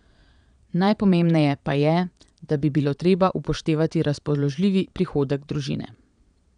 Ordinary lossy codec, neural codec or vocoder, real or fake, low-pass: none; vocoder, 22.05 kHz, 80 mel bands, Vocos; fake; 9.9 kHz